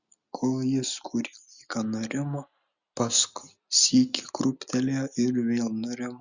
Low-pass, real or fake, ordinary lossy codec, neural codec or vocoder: 7.2 kHz; real; Opus, 64 kbps; none